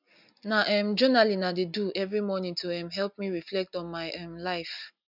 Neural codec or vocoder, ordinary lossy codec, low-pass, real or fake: none; none; 5.4 kHz; real